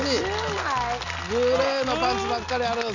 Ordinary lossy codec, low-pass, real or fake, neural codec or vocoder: none; 7.2 kHz; real; none